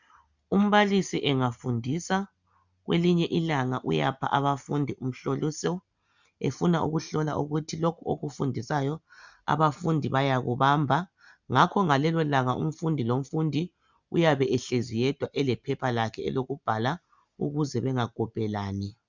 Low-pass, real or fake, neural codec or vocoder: 7.2 kHz; real; none